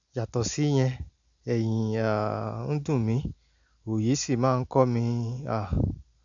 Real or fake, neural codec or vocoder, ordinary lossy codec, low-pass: real; none; none; 7.2 kHz